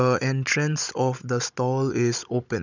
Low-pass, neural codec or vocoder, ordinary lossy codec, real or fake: 7.2 kHz; codec, 16 kHz, 16 kbps, FunCodec, trained on Chinese and English, 50 frames a second; none; fake